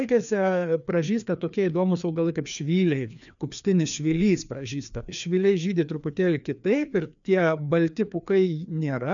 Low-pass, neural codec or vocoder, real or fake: 7.2 kHz; codec, 16 kHz, 2 kbps, FreqCodec, larger model; fake